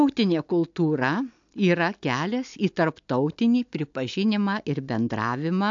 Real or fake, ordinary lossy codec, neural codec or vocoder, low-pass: real; AAC, 64 kbps; none; 7.2 kHz